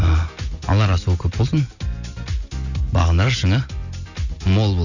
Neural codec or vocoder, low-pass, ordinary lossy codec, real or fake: none; 7.2 kHz; none; real